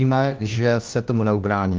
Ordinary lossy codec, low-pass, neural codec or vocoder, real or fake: Opus, 32 kbps; 7.2 kHz; codec, 16 kHz, 1 kbps, FunCodec, trained on LibriTTS, 50 frames a second; fake